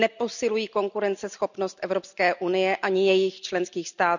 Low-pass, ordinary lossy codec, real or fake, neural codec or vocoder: 7.2 kHz; none; real; none